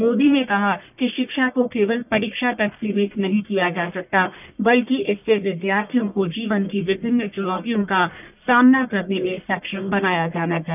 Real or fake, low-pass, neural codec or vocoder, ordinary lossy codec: fake; 3.6 kHz; codec, 44.1 kHz, 1.7 kbps, Pupu-Codec; none